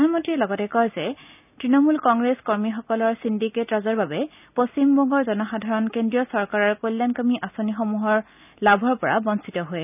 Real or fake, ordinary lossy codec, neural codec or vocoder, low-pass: real; none; none; 3.6 kHz